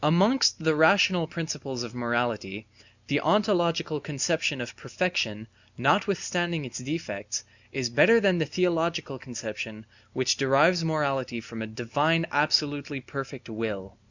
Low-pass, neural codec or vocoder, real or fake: 7.2 kHz; none; real